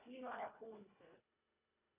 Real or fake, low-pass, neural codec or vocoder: fake; 3.6 kHz; codec, 24 kHz, 1.5 kbps, HILCodec